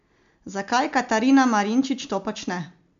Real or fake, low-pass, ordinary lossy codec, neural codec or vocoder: real; 7.2 kHz; MP3, 64 kbps; none